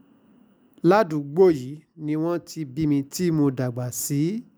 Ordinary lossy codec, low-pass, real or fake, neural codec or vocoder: none; none; real; none